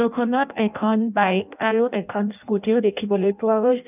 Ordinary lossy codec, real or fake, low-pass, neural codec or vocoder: none; fake; 3.6 kHz; codec, 16 kHz in and 24 kHz out, 0.6 kbps, FireRedTTS-2 codec